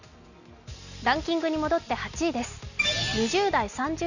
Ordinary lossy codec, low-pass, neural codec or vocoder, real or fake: none; 7.2 kHz; none; real